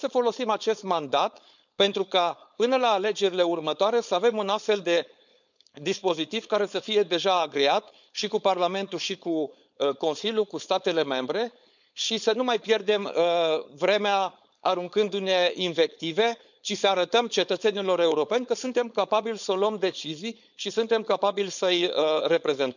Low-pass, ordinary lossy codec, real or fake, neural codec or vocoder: 7.2 kHz; none; fake; codec, 16 kHz, 4.8 kbps, FACodec